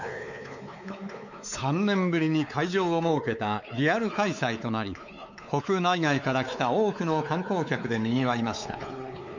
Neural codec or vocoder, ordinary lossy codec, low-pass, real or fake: codec, 16 kHz, 4 kbps, X-Codec, WavLM features, trained on Multilingual LibriSpeech; none; 7.2 kHz; fake